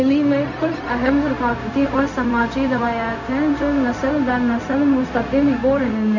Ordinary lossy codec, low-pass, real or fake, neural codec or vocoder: none; 7.2 kHz; fake; codec, 16 kHz, 0.4 kbps, LongCat-Audio-Codec